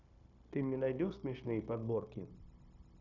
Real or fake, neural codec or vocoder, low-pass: fake; codec, 16 kHz, 0.9 kbps, LongCat-Audio-Codec; 7.2 kHz